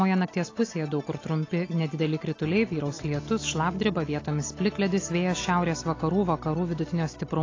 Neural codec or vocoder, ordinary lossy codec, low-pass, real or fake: none; AAC, 32 kbps; 7.2 kHz; real